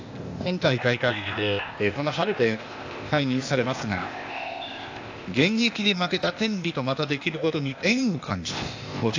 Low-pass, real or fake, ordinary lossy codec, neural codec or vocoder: 7.2 kHz; fake; AAC, 48 kbps; codec, 16 kHz, 0.8 kbps, ZipCodec